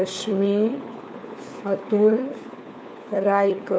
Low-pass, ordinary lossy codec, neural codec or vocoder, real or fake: none; none; codec, 16 kHz, 4 kbps, FunCodec, trained on LibriTTS, 50 frames a second; fake